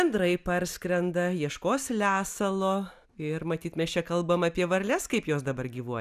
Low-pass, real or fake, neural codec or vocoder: 14.4 kHz; real; none